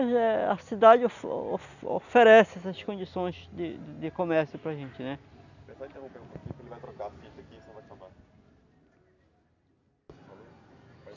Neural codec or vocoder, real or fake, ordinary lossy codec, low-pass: none; real; none; 7.2 kHz